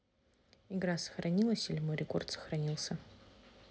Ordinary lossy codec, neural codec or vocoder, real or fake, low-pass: none; none; real; none